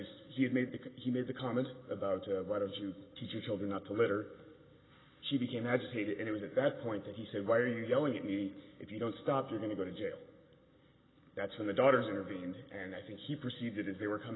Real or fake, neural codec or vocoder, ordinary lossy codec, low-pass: real; none; AAC, 16 kbps; 7.2 kHz